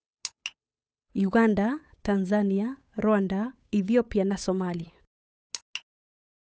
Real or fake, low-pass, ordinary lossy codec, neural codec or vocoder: fake; none; none; codec, 16 kHz, 8 kbps, FunCodec, trained on Chinese and English, 25 frames a second